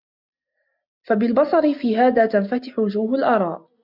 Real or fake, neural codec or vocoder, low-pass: real; none; 5.4 kHz